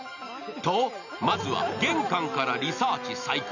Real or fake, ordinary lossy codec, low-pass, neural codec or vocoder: real; none; 7.2 kHz; none